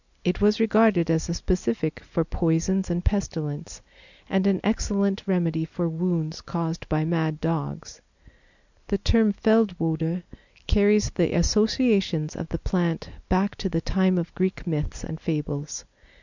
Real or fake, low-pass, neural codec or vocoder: real; 7.2 kHz; none